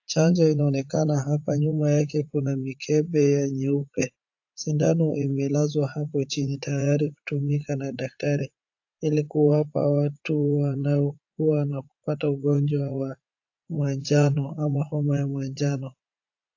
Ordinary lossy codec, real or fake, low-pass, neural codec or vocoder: AAC, 48 kbps; fake; 7.2 kHz; vocoder, 44.1 kHz, 80 mel bands, Vocos